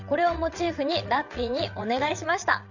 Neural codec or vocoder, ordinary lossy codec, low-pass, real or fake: vocoder, 22.05 kHz, 80 mel bands, WaveNeXt; none; 7.2 kHz; fake